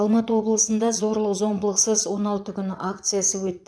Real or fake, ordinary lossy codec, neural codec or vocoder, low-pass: fake; none; vocoder, 22.05 kHz, 80 mel bands, Vocos; none